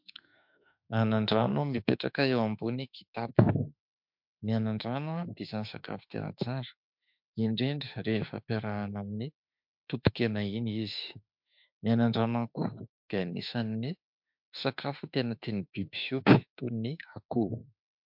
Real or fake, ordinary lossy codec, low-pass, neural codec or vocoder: fake; MP3, 48 kbps; 5.4 kHz; autoencoder, 48 kHz, 32 numbers a frame, DAC-VAE, trained on Japanese speech